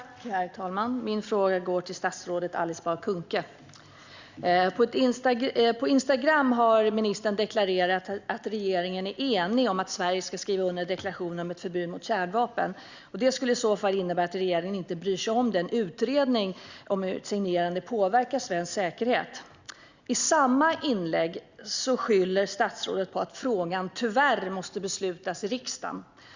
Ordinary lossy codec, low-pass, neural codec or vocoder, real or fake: Opus, 64 kbps; 7.2 kHz; none; real